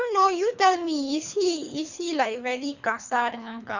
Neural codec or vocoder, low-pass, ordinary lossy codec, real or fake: codec, 24 kHz, 3 kbps, HILCodec; 7.2 kHz; none; fake